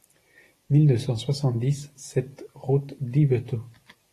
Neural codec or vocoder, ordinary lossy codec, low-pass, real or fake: none; AAC, 48 kbps; 14.4 kHz; real